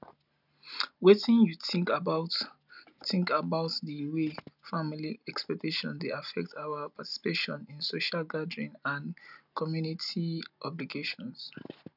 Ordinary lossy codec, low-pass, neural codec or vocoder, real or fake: none; 5.4 kHz; none; real